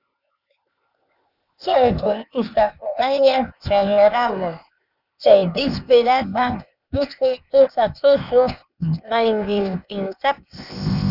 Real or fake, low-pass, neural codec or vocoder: fake; 5.4 kHz; codec, 16 kHz, 0.8 kbps, ZipCodec